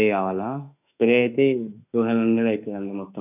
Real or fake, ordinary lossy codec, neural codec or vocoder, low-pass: fake; none; autoencoder, 48 kHz, 32 numbers a frame, DAC-VAE, trained on Japanese speech; 3.6 kHz